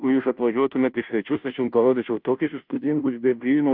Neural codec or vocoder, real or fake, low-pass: codec, 16 kHz, 0.5 kbps, FunCodec, trained on Chinese and English, 25 frames a second; fake; 5.4 kHz